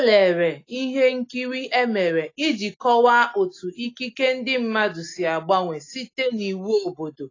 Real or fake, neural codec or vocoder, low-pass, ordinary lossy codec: real; none; 7.2 kHz; AAC, 32 kbps